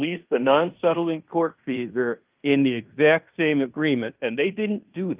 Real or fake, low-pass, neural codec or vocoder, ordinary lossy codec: fake; 3.6 kHz; codec, 16 kHz in and 24 kHz out, 0.9 kbps, LongCat-Audio-Codec, fine tuned four codebook decoder; Opus, 24 kbps